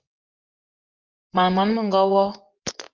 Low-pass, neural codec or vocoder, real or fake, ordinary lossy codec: 7.2 kHz; vocoder, 24 kHz, 100 mel bands, Vocos; fake; Opus, 24 kbps